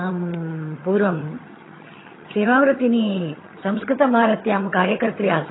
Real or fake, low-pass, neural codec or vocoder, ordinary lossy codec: fake; 7.2 kHz; vocoder, 22.05 kHz, 80 mel bands, HiFi-GAN; AAC, 16 kbps